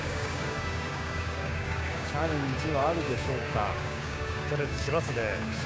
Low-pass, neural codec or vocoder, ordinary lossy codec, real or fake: none; codec, 16 kHz, 6 kbps, DAC; none; fake